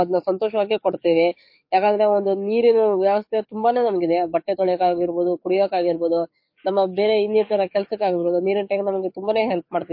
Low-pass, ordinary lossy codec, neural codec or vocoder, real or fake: 5.4 kHz; MP3, 32 kbps; codec, 44.1 kHz, 7.8 kbps, DAC; fake